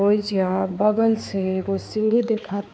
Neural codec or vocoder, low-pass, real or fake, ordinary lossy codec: codec, 16 kHz, 4 kbps, X-Codec, HuBERT features, trained on balanced general audio; none; fake; none